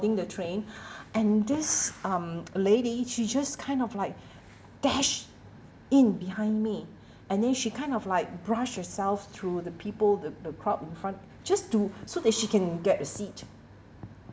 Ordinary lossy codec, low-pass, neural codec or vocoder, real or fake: none; none; none; real